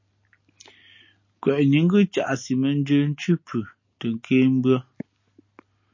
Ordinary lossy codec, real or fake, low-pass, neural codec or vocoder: MP3, 32 kbps; real; 7.2 kHz; none